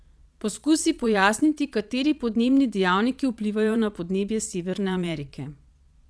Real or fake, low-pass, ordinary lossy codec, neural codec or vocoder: fake; none; none; vocoder, 22.05 kHz, 80 mel bands, Vocos